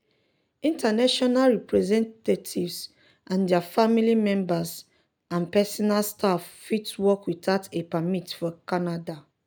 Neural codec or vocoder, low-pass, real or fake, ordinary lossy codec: none; none; real; none